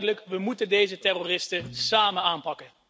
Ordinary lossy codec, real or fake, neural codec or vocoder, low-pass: none; real; none; none